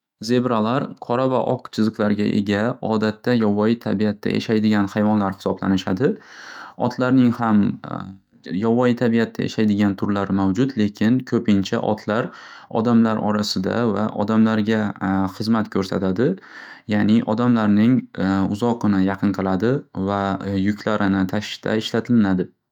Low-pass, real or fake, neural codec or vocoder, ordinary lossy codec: 19.8 kHz; fake; autoencoder, 48 kHz, 128 numbers a frame, DAC-VAE, trained on Japanese speech; none